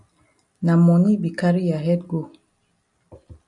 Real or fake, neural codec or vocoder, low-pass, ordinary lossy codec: real; none; 10.8 kHz; MP3, 96 kbps